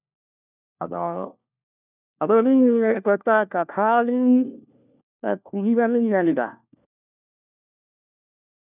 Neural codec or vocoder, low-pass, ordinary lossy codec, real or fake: codec, 16 kHz, 1 kbps, FunCodec, trained on LibriTTS, 50 frames a second; 3.6 kHz; none; fake